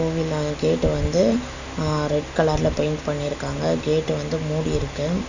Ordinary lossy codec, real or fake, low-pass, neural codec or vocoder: none; real; 7.2 kHz; none